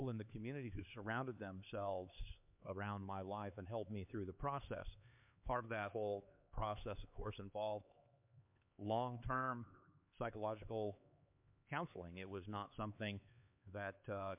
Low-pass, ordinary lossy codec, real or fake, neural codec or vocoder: 3.6 kHz; MP3, 32 kbps; fake; codec, 16 kHz, 4 kbps, X-Codec, HuBERT features, trained on LibriSpeech